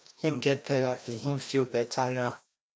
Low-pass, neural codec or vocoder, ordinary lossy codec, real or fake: none; codec, 16 kHz, 1 kbps, FreqCodec, larger model; none; fake